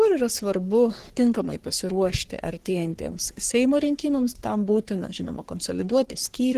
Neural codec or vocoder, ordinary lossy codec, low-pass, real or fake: codec, 44.1 kHz, 3.4 kbps, Pupu-Codec; Opus, 16 kbps; 14.4 kHz; fake